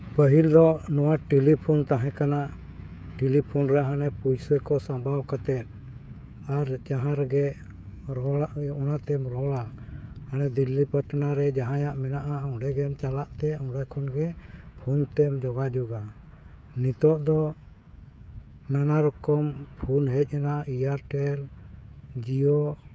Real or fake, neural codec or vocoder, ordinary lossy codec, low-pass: fake; codec, 16 kHz, 16 kbps, FreqCodec, smaller model; none; none